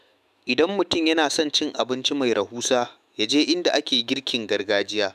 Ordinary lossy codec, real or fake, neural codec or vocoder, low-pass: none; fake; autoencoder, 48 kHz, 128 numbers a frame, DAC-VAE, trained on Japanese speech; 14.4 kHz